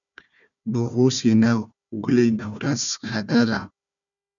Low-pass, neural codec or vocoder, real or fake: 7.2 kHz; codec, 16 kHz, 1 kbps, FunCodec, trained on Chinese and English, 50 frames a second; fake